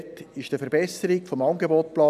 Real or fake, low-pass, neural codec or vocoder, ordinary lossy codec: real; 14.4 kHz; none; none